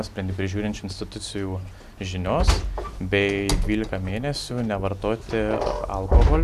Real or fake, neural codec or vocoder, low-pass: real; none; 14.4 kHz